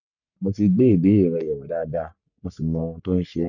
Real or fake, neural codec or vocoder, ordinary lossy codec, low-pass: fake; codec, 44.1 kHz, 3.4 kbps, Pupu-Codec; none; 7.2 kHz